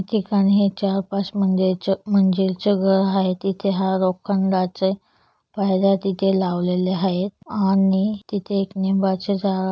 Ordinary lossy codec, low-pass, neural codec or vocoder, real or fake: none; none; none; real